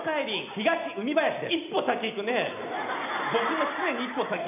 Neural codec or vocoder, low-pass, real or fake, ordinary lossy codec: none; 3.6 kHz; real; none